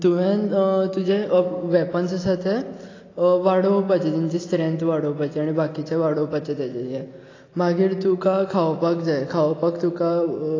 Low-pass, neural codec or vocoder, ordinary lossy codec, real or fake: 7.2 kHz; none; AAC, 32 kbps; real